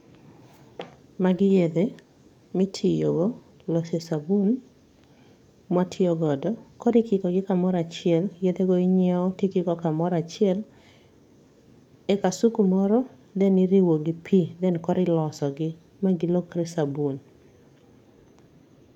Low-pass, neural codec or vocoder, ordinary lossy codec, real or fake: 19.8 kHz; codec, 44.1 kHz, 7.8 kbps, DAC; MP3, 96 kbps; fake